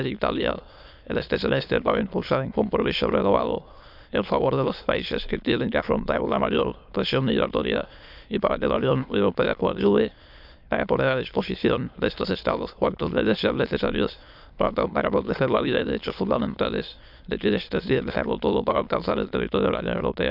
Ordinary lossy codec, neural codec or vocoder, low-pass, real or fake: none; autoencoder, 22.05 kHz, a latent of 192 numbers a frame, VITS, trained on many speakers; 5.4 kHz; fake